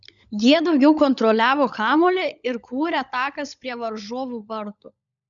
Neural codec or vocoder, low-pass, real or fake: codec, 16 kHz, 16 kbps, FunCodec, trained on LibriTTS, 50 frames a second; 7.2 kHz; fake